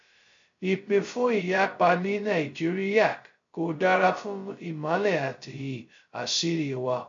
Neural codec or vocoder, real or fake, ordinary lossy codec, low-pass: codec, 16 kHz, 0.2 kbps, FocalCodec; fake; MP3, 48 kbps; 7.2 kHz